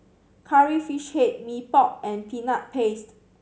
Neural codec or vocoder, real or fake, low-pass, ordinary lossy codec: none; real; none; none